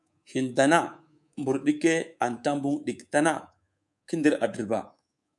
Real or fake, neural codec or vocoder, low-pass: fake; codec, 24 kHz, 3.1 kbps, DualCodec; 10.8 kHz